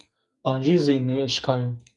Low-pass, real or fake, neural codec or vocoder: 10.8 kHz; fake; codec, 44.1 kHz, 2.6 kbps, SNAC